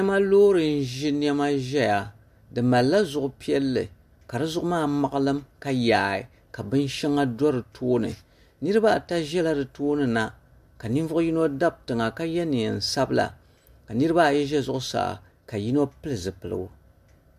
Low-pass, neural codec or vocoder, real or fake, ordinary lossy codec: 14.4 kHz; none; real; MP3, 64 kbps